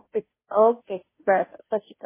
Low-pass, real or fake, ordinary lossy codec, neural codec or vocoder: 3.6 kHz; fake; MP3, 16 kbps; codec, 16 kHz, 0.5 kbps, FunCodec, trained on Chinese and English, 25 frames a second